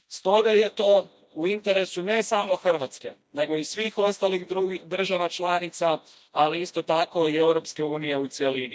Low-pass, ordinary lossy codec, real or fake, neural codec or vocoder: none; none; fake; codec, 16 kHz, 1 kbps, FreqCodec, smaller model